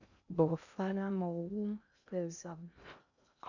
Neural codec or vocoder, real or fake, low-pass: codec, 16 kHz in and 24 kHz out, 0.6 kbps, FocalCodec, streaming, 2048 codes; fake; 7.2 kHz